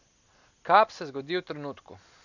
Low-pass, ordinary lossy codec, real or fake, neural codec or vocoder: 7.2 kHz; none; real; none